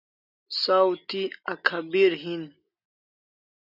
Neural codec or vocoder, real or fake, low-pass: none; real; 5.4 kHz